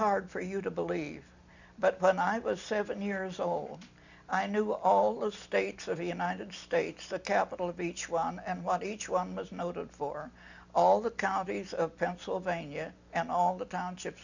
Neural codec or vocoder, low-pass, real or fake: none; 7.2 kHz; real